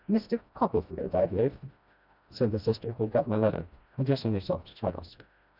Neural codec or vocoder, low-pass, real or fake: codec, 16 kHz, 1 kbps, FreqCodec, smaller model; 5.4 kHz; fake